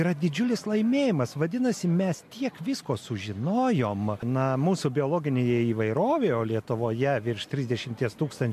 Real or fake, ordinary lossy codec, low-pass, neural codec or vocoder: real; MP3, 64 kbps; 14.4 kHz; none